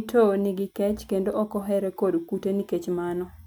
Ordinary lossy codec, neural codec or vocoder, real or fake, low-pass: none; none; real; none